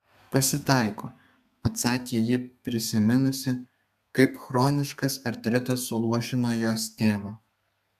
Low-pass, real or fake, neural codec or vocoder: 14.4 kHz; fake; codec, 32 kHz, 1.9 kbps, SNAC